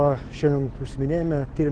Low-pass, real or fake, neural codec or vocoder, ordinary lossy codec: 9.9 kHz; fake; autoencoder, 48 kHz, 128 numbers a frame, DAC-VAE, trained on Japanese speech; Opus, 16 kbps